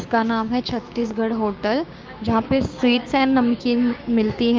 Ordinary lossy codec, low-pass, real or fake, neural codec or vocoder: Opus, 32 kbps; 7.2 kHz; fake; codec, 16 kHz, 6 kbps, DAC